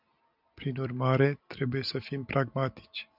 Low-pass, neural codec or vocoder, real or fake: 5.4 kHz; none; real